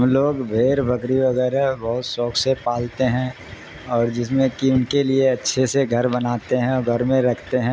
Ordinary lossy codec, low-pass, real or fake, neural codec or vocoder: none; none; real; none